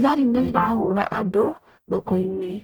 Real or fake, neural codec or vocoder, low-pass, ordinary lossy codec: fake; codec, 44.1 kHz, 0.9 kbps, DAC; none; none